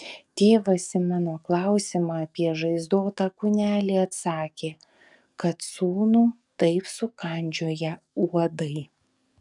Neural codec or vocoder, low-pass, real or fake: codec, 44.1 kHz, 7.8 kbps, DAC; 10.8 kHz; fake